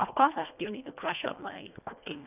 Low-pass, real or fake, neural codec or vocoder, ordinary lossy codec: 3.6 kHz; fake; codec, 24 kHz, 1.5 kbps, HILCodec; none